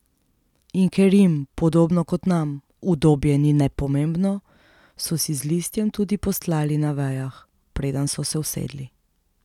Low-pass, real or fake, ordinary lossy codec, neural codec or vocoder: 19.8 kHz; real; none; none